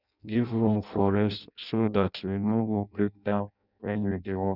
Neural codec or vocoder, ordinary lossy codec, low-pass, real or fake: codec, 16 kHz in and 24 kHz out, 0.6 kbps, FireRedTTS-2 codec; none; 5.4 kHz; fake